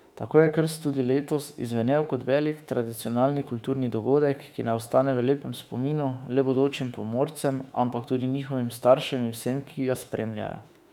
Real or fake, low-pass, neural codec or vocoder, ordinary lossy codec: fake; 19.8 kHz; autoencoder, 48 kHz, 32 numbers a frame, DAC-VAE, trained on Japanese speech; none